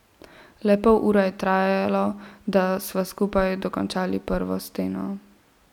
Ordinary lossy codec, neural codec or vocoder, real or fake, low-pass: none; none; real; 19.8 kHz